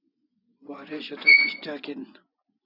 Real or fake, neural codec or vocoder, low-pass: fake; vocoder, 24 kHz, 100 mel bands, Vocos; 5.4 kHz